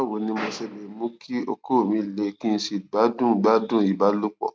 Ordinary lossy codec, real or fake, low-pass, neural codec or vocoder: none; real; none; none